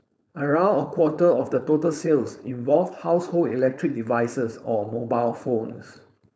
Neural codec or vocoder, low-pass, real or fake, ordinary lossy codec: codec, 16 kHz, 4.8 kbps, FACodec; none; fake; none